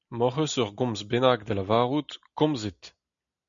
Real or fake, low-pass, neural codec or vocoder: real; 7.2 kHz; none